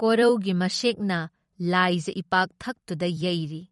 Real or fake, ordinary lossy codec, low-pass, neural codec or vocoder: fake; MP3, 48 kbps; 19.8 kHz; vocoder, 44.1 kHz, 128 mel bands every 256 samples, BigVGAN v2